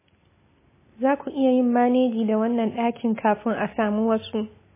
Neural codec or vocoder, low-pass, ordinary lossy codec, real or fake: none; 3.6 kHz; MP3, 16 kbps; real